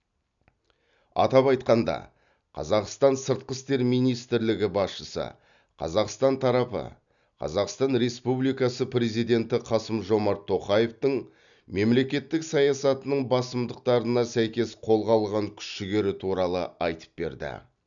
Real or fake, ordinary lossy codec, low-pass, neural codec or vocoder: real; none; 7.2 kHz; none